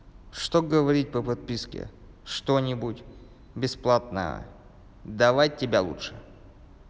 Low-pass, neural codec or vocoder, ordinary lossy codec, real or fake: none; none; none; real